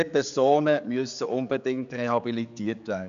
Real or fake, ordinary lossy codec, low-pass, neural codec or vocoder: fake; MP3, 96 kbps; 7.2 kHz; codec, 16 kHz, 4 kbps, X-Codec, HuBERT features, trained on general audio